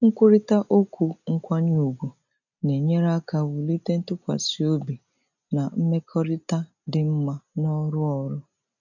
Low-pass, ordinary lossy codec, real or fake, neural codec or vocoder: 7.2 kHz; none; real; none